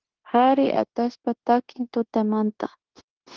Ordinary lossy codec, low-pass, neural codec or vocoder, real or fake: Opus, 16 kbps; 7.2 kHz; codec, 16 kHz, 0.9 kbps, LongCat-Audio-Codec; fake